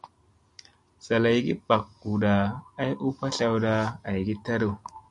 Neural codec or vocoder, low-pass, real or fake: none; 10.8 kHz; real